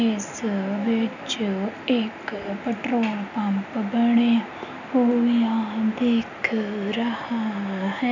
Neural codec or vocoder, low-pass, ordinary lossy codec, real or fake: none; 7.2 kHz; none; real